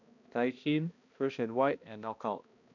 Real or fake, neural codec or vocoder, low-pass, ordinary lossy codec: fake; codec, 16 kHz, 1 kbps, X-Codec, HuBERT features, trained on balanced general audio; 7.2 kHz; none